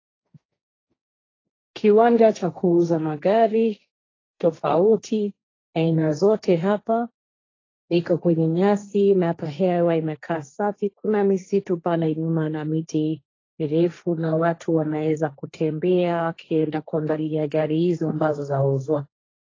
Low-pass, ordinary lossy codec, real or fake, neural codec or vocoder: 7.2 kHz; AAC, 32 kbps; fake; codec, 16 kHz, 1.1 kbps, Voila-Tokenizer